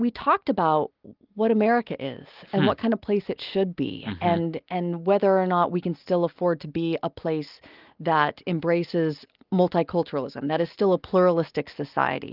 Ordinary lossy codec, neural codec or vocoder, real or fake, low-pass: Opus, 32 kbps; none; real; 5.4 kHz